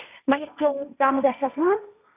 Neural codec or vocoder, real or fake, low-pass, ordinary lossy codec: codec, 16 kHz, 1.1 kbps, Voila-Tokenizer; fake; 3.6 kHz; none